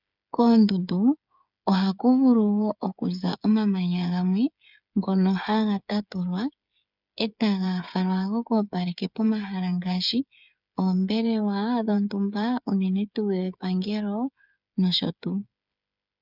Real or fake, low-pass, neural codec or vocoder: fake; 5.4 kHz; codec, 16 kHz, 8 kbps, FreqCodec, smaller model